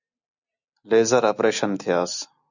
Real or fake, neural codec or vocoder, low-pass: real; none; 7.2 kHz